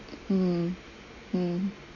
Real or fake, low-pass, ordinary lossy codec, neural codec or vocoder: real; 7.2 kHz; MP3, 32 kbps; none